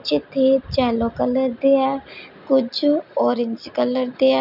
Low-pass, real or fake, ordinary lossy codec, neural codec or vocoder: 5.4 kHz; real; none; none